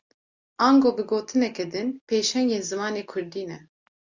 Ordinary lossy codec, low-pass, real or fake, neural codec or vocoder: Opus, 64 kbps; 7.2 kHz; real; none